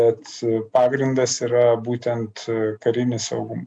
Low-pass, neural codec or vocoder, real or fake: 9.9 kHz; none; real